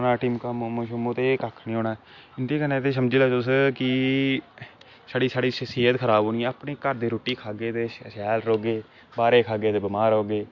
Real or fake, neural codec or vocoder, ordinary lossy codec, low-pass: real; none; MP3, 48 kbps; 7.2 kHz